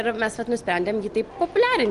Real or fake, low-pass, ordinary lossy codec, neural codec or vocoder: real; 10.8 kHz; Opus, 24 kbps; none